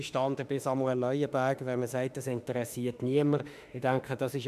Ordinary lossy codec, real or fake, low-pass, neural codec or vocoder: MP3, 96 kbps; fake; 14.4 kHz; autoencoder, 48 kHz, 32 numbers a frame, DAC-VAE, trained on Japanese speech